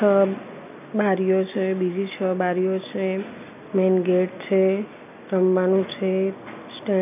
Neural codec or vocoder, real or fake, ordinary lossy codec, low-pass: none; real; none; 3.6 kHz